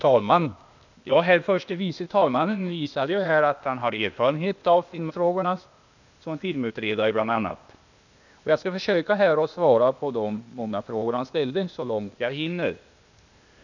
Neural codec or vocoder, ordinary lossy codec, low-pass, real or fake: codec, 16 kHz, 0.8 kbps, ZipCodec; none; 7.2 kHz; fake